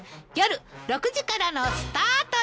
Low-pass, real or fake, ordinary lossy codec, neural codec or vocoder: none; real; none; none